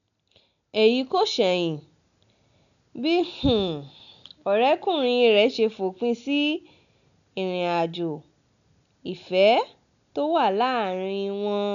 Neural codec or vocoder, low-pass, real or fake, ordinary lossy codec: none; 7.2 kHz; real; none